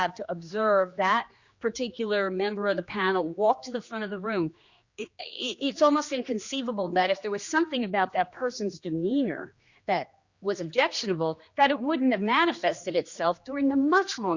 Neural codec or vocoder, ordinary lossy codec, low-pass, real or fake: codec, 16 kHz, 2 kbps, X-Codec, HuBERT features, trained on general audio; Opus, 64 kbps; 7.2 kHz; fake